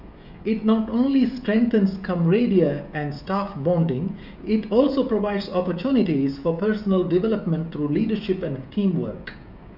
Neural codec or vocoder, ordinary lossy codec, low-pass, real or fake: codec, 16 kHz, 16 kbps, FreqCodec, smaller model; none; 5.4 kHz; fake